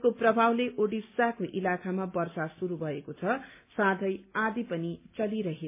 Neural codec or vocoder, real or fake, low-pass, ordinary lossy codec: none; real; 3.6 kHz; MP3, 24 kbps